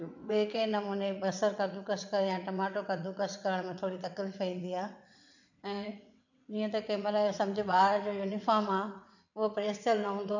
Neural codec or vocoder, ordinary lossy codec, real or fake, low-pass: vocoder, 22.05 kHz, 80 mel bands, WaveNeXt; none; fake; 7.2 kHz